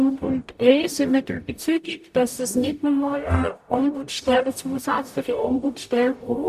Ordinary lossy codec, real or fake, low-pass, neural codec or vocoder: none; fake; 14.4 kHz; codec, 44.1 kHz, 0.9 kbps, DAC